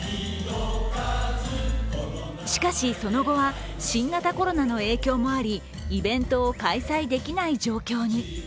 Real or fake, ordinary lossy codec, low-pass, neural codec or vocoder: real; none; none; none